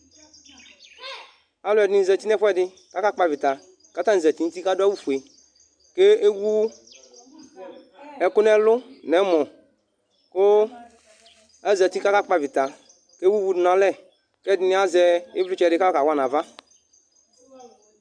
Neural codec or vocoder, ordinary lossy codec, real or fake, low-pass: none; MP3, 96 kbps; real; 9.9 kHz